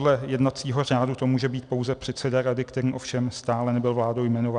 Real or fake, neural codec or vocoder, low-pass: real; none; 9.9 kHz